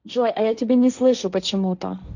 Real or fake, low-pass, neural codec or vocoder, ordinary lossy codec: fake; 7.2 kHz; codec, 16 kHz, 1.1 kbps, Voila-Tokenizer; none